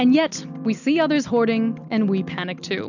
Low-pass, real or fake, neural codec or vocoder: 7.2 kHz; real; none